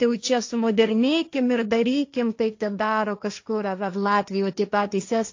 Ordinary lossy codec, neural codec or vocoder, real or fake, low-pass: AAC, 48 kbps; codec, 16 kHz, 1.1 kbps, Voila-Tokenizer; fake; 7.2 kHz